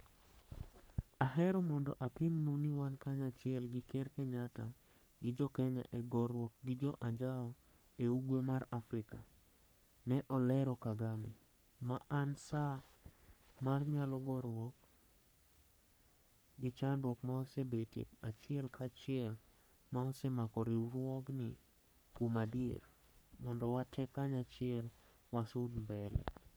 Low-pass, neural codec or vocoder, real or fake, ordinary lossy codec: none; codec, 44.1 kHz, 3.4 kbps, Pupu-Codec; fake; none